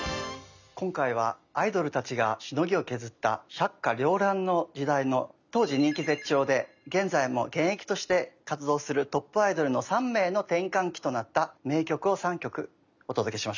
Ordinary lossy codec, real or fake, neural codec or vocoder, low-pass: none; real; none; 7.2 kHz